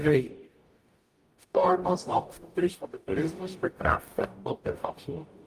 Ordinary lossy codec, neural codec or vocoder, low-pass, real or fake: Opus, 32 kbps; codec, 44.1 kHz, 0.9 kbps, DAC; 14.4 kHz; fake